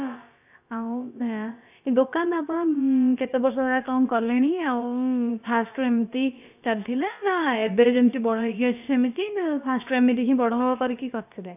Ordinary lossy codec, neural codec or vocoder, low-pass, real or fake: none; codec, 16 kHz, about 1 kbps, DyCAST, with the encoder's durations; 3.6 kHz; fake